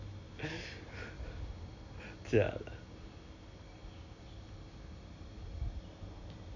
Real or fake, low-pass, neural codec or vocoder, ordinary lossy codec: real; 7.2 kHz; none; none